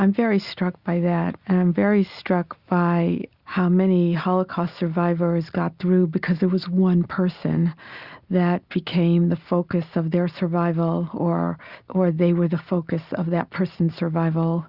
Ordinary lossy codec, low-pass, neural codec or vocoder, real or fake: Opus, 64 kbps; 5.4 kHz; none; real